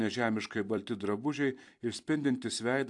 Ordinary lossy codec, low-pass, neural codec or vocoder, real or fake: AAC, 64 kbps; 10.8 kHz; vocoder, 44.1 kHz, 128 mel bands every 256 samples, BigVGAN v2; fake